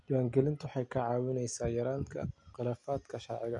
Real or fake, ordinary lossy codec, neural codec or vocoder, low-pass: real; none; none; 10.8 kHz